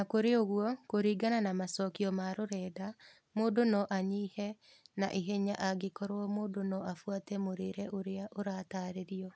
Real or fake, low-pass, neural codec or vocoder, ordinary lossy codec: real; none; none; none